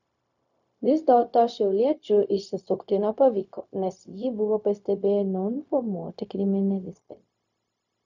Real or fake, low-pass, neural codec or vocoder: fake; 7.2 kHz; codec, 16 kHz, 0.4 kbps, LongCat-Audio-Codec